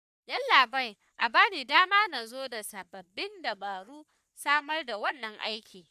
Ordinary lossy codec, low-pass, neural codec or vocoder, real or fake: none; 14.4 kHz; codec, 44.1 kHz, 3.4 kbps, Pupu-Codec; fake